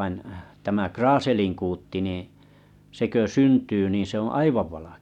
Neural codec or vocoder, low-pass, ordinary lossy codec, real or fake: none; 19.8 kHz; none; real